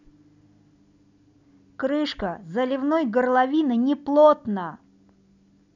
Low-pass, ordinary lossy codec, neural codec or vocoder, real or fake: 7.2 kHz; none; none; real